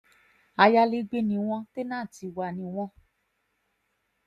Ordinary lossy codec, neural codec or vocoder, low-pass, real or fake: none; none; 14.4 kHz; real